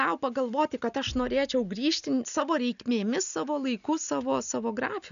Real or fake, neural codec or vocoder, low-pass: real; none; 7.2 kHz